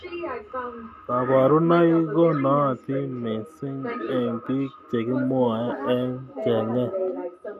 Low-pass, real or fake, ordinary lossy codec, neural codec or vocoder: 14.4 kHz; real; none; none